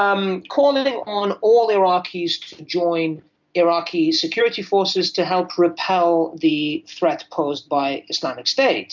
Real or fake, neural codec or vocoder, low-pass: real; none; 7.2 kHz